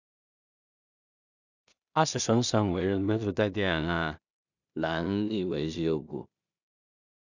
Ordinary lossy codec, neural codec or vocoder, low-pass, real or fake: none; codec, 16 kHz in and 24 kHz out, 0.4 kbps, LongCat-Audio-Codec, two codebook decoder; 7.2 kHz; fake